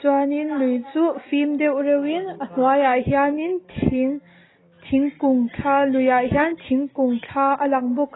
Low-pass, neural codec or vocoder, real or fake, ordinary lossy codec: 7.2 kHz; none; real; AAC, 16 kbps